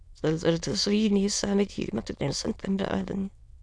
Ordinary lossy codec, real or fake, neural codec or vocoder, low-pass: none; fake; autoencoder, 22.05 kHz, a latent of 192 numbers a frame, VITS, trained on many speakers; none